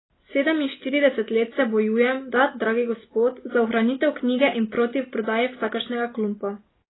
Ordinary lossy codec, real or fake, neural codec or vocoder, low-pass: AAC, 16 kbps; real; none; 7.2 kHz